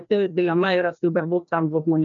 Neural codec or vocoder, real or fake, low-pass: codec, 16 kHz, 1 kbps, FreqCodec, larger model; fake; 7.2 kHz